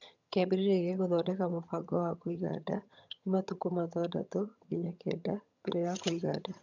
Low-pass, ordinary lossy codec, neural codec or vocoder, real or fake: 7.2 kHz; none; vocoder, 22.05 kHz, 80 mel bands, HiFi-GAN; fake